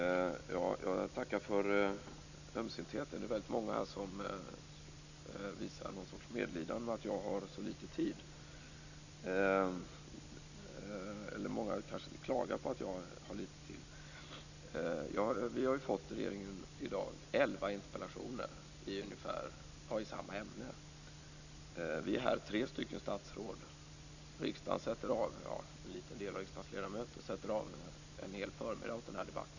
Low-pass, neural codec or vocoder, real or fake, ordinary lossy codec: 7.2 kHz; none; real; none